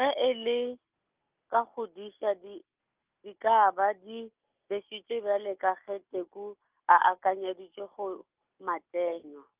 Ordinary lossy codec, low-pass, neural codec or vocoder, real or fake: Opus, 24 kbps; 3.6 kHz; none; real